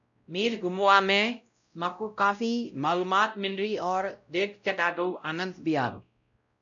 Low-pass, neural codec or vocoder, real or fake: 7.2 kHz; codec, 16 kHz, 0.5 kbps, X-Codec, WavLM features, trained on Multilingual LibriSpeech; fake